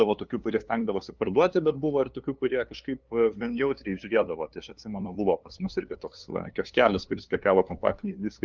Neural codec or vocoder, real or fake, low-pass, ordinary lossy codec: codec, 16 kHz, 2 kbps, FunCodec, trained on LibriTTS, 25 frames a second; fake; 7.2 kHz; Opus, 24 kbps